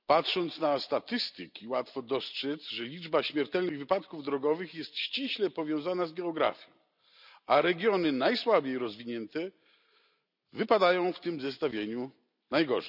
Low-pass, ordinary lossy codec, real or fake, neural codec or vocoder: 5.4 kHz; none; real; none